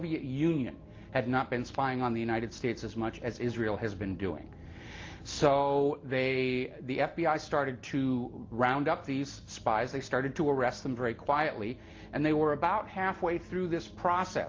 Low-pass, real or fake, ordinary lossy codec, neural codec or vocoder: 7.2 kHz; real; Opus, 24 kbps; none